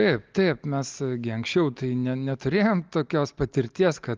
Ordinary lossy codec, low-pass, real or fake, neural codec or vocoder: Opus, 32 kbps; 7.2 kHz; real; none